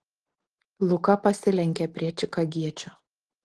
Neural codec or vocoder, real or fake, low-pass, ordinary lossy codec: none; real; 10.8 kHz; Opus, 16 kbps